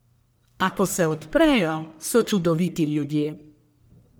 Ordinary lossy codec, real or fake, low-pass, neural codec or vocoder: none; fake; none; codec, 44.1 kHz, 1.7 kbps, Pupu-Codec